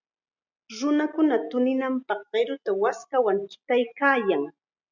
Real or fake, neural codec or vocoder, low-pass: real; none; 7.2 kHz